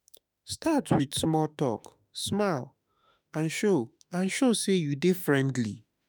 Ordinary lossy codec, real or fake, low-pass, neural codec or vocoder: none; fake; none; autoencoder, 48 kHz, 32 numbers a frame, DAC-VAE, trained on Japanese speech